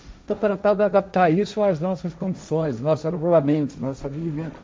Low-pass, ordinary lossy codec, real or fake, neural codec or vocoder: none; none; fake; codec, 16 kHz, 1.1 kbps, Voila-Tokenizer